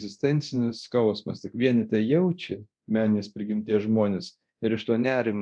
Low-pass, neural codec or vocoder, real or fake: 9.9 kHz; codec, 24 kHz, 0.9 kbps, DualCodec; fake